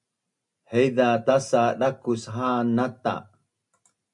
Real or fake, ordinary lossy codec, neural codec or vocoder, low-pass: real; MP3, 96 kbps; none; 10.8 kHz